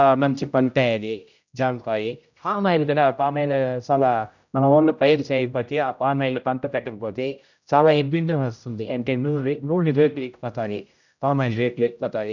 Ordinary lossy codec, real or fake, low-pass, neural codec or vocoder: none; fake; 7.2 kHz; codec, 16 kHz, 0.5 kbps, X-Codec, HuBERT features, trained on general audio